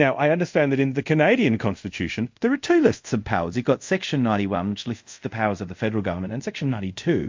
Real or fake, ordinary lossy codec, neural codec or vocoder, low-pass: fake; MP3, 48 kbps; codec, 24 kHz, 0.5 kbps, DualCodec; 7.2 kHz